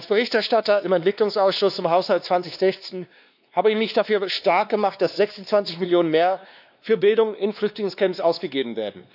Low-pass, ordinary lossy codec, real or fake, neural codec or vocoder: 5.4 kHz; none; fake; codec, 16 kHz, 2 kbps, X-Codec, WavLM features, trained on Multilingual LibriSpeech